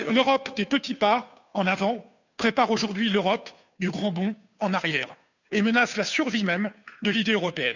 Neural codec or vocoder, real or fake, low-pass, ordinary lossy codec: codec, 16 kHz, 2 kbps, FunCodec, trained on Chinese and English, 25 frames a second; fake; 7.2 kHz; none